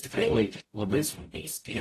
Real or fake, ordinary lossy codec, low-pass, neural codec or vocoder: fake; AAC, 48 kbps; 14.4 kHz; codec, 44.1 kHz, 0.9 kbps, DAC